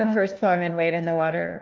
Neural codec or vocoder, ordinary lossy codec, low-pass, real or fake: codec, 16 kHz, 1 kbps, FunCodec, trained on LibriTTS, 50 frames a second; Opus, 24 kbps; 7.2 kHz; fake